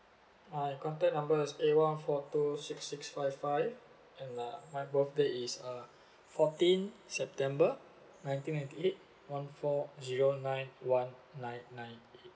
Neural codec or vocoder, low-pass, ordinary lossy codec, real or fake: none; none; none; real